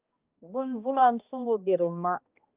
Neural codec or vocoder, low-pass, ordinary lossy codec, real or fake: codec, 16 kHz, 1 kbps, X-Codec, HuBERT features, trained on balanced general audio; 3.6 kHz; Opus, 24 kbps; fake